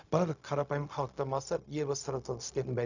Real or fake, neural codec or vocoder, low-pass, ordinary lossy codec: fake; codec, 16 kHz, 0.4 kbps, LongCat-Audio-Codec; 7.2 kHz; none